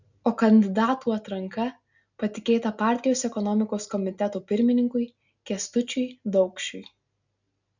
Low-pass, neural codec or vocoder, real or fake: 7.2 kHz; none; real